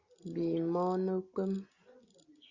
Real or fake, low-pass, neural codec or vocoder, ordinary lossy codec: real; 7.2 kHz; none; Opus, 64 kbps